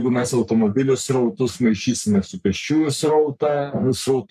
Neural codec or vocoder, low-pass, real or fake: codec, 44.1 kHz, 3.4 kbps, Pupu-Codec; 14.4 kHz; fake